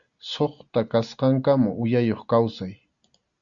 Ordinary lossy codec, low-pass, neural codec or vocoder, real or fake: Opus, 64 kbps; 7.2 kHz; none; real